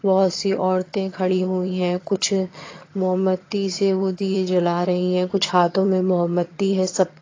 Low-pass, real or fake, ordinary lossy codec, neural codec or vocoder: 7.2 kHz; fake; AAC, 32 kbps; vocoder, 22.05 kHz, 80 mel bands, HiFi-GAN